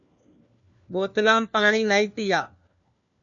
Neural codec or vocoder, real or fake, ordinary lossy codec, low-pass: codec, 16 kHz, 1 kbps, FunCodec, trained on LibriTTS, 50 frames a second; fake; MP3, 96 kbps; 7.2 kHz